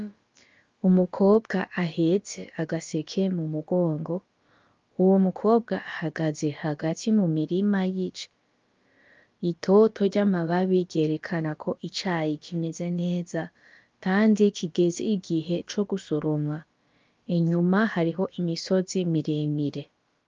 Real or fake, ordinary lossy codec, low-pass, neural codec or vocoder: fake; Opus, 32 kbps; 7.2 kHz; codec, 16 kHz, about 1 kbps, DyCAST, with the encoder's durations